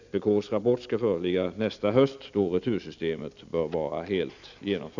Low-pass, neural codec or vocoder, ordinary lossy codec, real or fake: 7.2 kHz; none; none; real